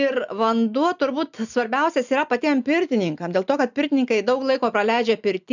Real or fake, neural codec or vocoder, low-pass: real; none; 7.2 kHz